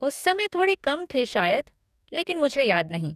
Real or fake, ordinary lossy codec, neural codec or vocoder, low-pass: fake; none; codec, 44.1 kHz, 2.6 kbps, DAC; 14.4 kHz